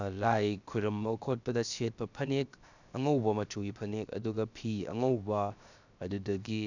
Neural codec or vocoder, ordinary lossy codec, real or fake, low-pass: codec, 16 kHz, 0.7 kbps, FocalCodec; none; fake; 7.2 kHz